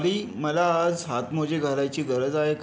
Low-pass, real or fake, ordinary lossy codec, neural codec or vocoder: none; real; none; none